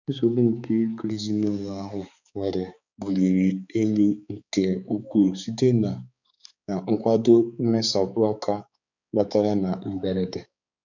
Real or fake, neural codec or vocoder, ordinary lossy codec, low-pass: fake; codec, 16 kHz, 4 kbps, X-Codec, HuBERT features, trained on balanced general audio; none; 7.2 kHz